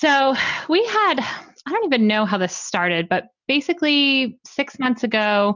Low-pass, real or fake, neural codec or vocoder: 7.2 kHz; real; none